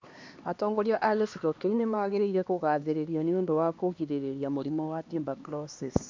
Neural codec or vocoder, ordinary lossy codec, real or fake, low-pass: codec, 16 kHz, 2 kbps, X-Codec, HuBERT features, trained on LibriSpeech; MP3, 48 kbps; fake; 7.2 kHz